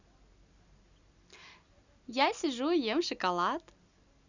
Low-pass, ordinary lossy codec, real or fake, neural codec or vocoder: 7.2 kHz; Opus, 64 kbps; real; none